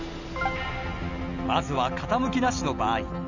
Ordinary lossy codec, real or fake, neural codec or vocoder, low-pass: none; real; none; 7.2 kHz